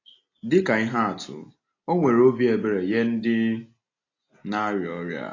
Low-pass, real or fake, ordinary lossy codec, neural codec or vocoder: 7.2 kHz; real; AAC, 32 kbps; none